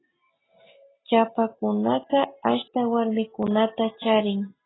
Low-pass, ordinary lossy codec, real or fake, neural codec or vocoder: 7.2 kHz; AAC, 16 kbps; real; none